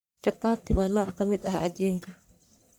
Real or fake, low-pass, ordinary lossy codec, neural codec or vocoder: fake; none; none; codec, 44.1 kHz, 1.7 kbps, Pupu-Codec